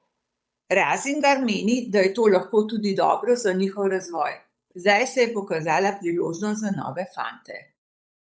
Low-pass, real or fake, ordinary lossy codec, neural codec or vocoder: none; fake; none; codec, 16 kHz, 8 kbps, FunCodec, trained on Chinese and English, 25 frames a second